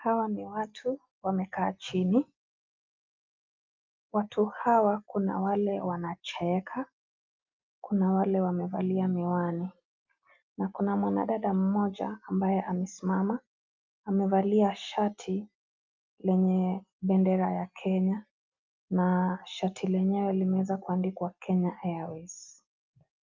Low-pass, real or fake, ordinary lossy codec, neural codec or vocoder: 7.2 kHz; real; Opus, 24 kbps; none